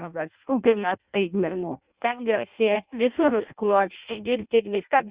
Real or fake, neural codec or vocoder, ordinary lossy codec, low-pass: fake; codec, 16 kHz in and 24 kHz out, 0.6 kbps, FireRedTTS-2 codec; Opus, 64 kbps; 3.6 kHz